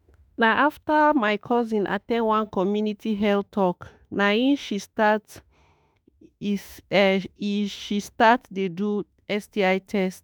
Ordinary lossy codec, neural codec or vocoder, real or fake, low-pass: none; autoencoder, 48 kHz, 32 numbers a frame, DAC-VAE, trained on Japanese speech; fake; none